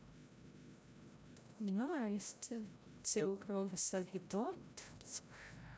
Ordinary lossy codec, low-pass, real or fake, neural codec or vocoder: none; none; fake; codec, 16 kHz, 0.5 kbps, FreqCodec, larger model